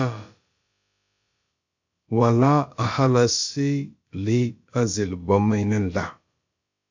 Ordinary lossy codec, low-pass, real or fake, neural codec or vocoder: MP3, 48 kbps; 7.2 kHz; fake; codec, 16 kHz, about 1 kbps, DyCAST, with the encoder's durations